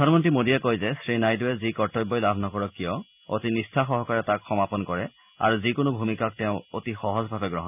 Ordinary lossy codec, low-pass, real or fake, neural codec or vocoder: none; 3.6 kHz; real; none